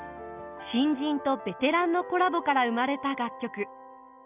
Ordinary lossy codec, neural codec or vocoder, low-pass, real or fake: none; none; 3.6 kHz; real